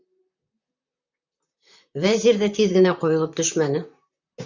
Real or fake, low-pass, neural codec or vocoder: fake; 7.2 kHz; vocoder, 44.1 kHz, 128 mel bands, Pupu-Vocoder